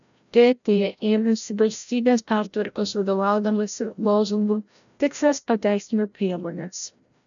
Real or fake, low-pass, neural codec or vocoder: fake; 7.2 kHz; codec, 16 kHz, 0.5 kbps, FreqCodec, larger model